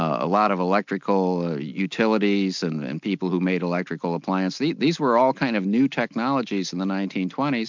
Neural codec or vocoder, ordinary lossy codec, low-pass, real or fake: none; MP3, 64 kbps; 7.2 kHz; real